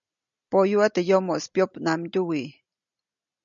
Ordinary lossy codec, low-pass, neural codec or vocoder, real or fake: MP3, 96 kbps; 7.2 kHz; none; real